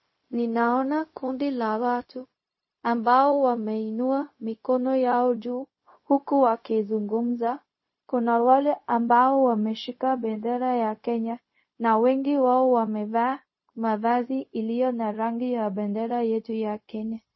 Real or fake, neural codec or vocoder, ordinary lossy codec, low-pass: fake; codec, 16 kHz, 0.4 kbps, LongCat-Audio-Codec; MP3, 24 kbps; 7.2 kHz